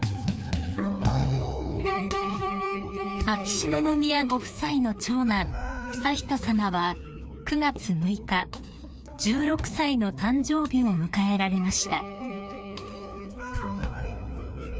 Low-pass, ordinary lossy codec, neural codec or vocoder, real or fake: none; none; codec, 16 kHz, 2 kbps, FreqCodec, larger model; fake